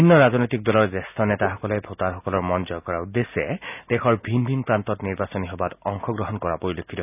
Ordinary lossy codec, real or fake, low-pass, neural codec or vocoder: none; real; 3.6 kHz; none